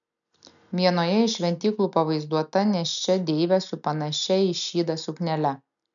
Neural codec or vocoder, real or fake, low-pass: none; real; 7.2 kHz